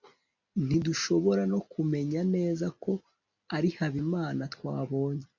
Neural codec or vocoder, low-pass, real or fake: none; 7.2 kHz; real